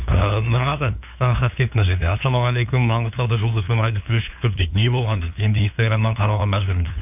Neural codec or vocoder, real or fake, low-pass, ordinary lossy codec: codec, 16 kHz, 2 kbps, FunCodec, trained on LibriTTS, 25 frames a second; fake; 3.6 kHz; none